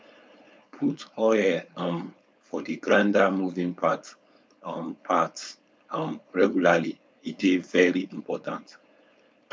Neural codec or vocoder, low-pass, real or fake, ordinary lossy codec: codec, 16 kHz, 4.8 kbps, FACodec; none; fake; none